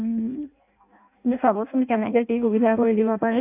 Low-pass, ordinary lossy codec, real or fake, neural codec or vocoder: 3.6 kHz; AAC, 24 kbps; fake; codec, 16 kHz in and 24 kHz out, 0.6 kbps, FireRedTTS-2 codec